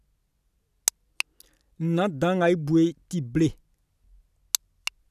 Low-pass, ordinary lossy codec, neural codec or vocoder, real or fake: 14.4 kHz; none; none; real